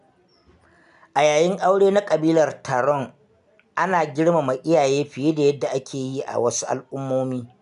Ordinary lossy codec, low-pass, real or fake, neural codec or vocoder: none; 10.8 kHz; real; none